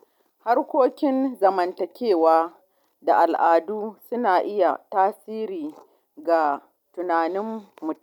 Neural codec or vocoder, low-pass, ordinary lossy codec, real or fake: none; 19.8 kHz; none; real